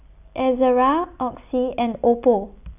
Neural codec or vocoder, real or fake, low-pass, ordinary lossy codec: none; real; 3.6 kHz; none